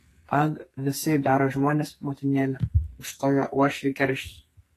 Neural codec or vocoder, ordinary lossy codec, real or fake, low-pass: codec, 44.1 kHz, 2.6 kbps, SNAC; AAC, 48 kbps; fake; 14.4 kHz